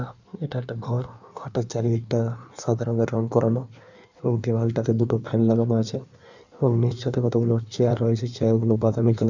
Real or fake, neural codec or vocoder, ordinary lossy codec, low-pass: fake; codec, 16 kHz in and 24 kHz out, 1.1 kbps, FireRedTTS-2 codec; none; 7.2 kHz